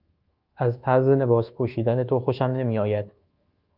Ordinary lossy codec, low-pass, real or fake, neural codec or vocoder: Opus, 32 kbps; 5.4 kHz; fake; codec, 24 kHz, 1.2 kbps, DualCodec